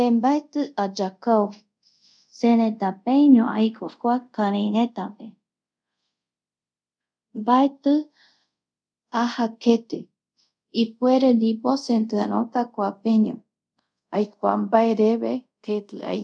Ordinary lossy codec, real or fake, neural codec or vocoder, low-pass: none; fake; codec, 24 kHz, 0.5 kbps, DualCodec; 9.9 kHz